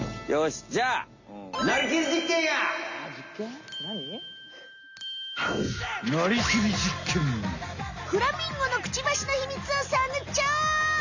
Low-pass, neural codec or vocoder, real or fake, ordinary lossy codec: 7.2 kHz; none; real; Opus, 64 kbps